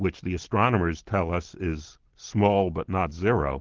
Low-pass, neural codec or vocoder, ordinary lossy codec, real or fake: 7.2 kHz; none; Opus, 16 kbps; real